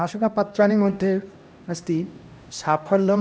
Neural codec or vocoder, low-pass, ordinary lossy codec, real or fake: codec, 16 kHz, 1 kbps, X-Codec, HuBERT features, trained on LibriSpeech; none; none; fake